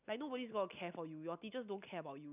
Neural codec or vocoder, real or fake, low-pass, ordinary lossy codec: none; real; 3.6 kHz; none